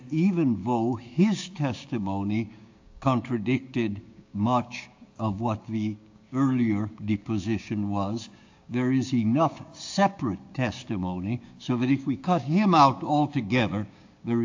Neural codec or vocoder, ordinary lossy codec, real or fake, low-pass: codec, 16 kHz, 6 kbps, DAC; AAC, 48 kbps; fake; 7.2 kHz